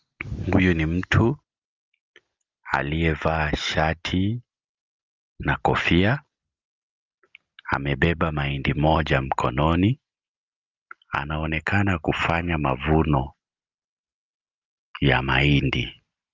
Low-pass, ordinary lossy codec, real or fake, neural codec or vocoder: 7.2 kHz; Opus, 32 kbps; real; none